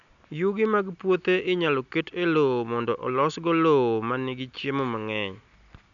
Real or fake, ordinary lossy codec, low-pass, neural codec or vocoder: real; none; 7.2 kHz; none